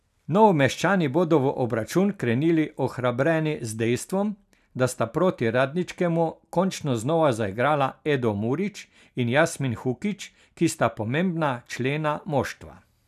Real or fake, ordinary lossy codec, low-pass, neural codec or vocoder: real; none; 14.4 kHz; none